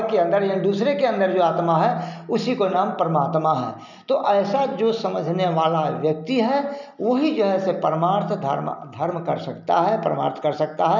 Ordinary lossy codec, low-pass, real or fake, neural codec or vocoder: none; 7.2 kHz; real; none